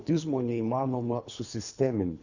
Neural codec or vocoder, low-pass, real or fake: codec, 24 kHz, 3 kbps, HILCodec; 7.2 kHz; fake